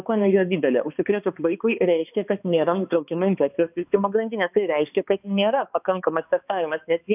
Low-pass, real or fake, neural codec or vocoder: 3.6 kHz; fake; codec, 16 kHz, 2 kbps, X-Codec, HuBERT features, trained on balanced general audio